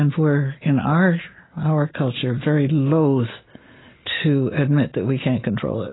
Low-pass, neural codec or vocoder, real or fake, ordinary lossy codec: 7.2 kHz; none; real; AAC, 16 kbps